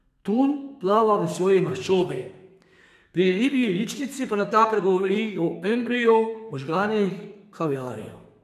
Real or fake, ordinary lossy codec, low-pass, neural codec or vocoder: fake; none; 14.4 kHz; codec, 32 kHz, 1.9 kbps, SNAC